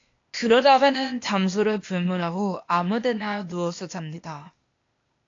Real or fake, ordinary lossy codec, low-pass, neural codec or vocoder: fake; AAC, 48 kbps; 7.2 kHz; codec, 16 kHz, 0.8 kbps, ZipCodec